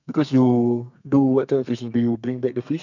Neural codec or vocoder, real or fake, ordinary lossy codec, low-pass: codec, 32 kHz, 1.9 kbps, SNAC; fake; none; 7.2 kHz